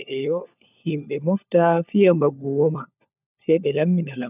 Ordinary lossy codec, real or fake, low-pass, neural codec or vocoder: none; fake; 3.6 kHz; codec, 16 kHz, 4 kbps, FunCodec, trained on LibriTTS, 50 frames a second